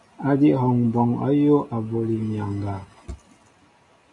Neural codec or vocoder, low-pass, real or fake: none; 10.8 kHz; real